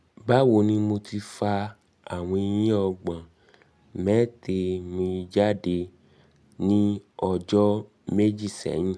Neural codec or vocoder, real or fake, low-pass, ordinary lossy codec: none; real; none; none